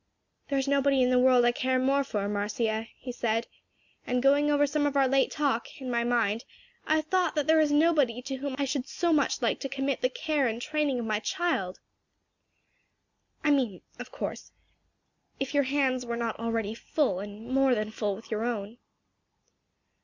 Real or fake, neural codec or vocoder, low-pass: real; none; 7.2 kHz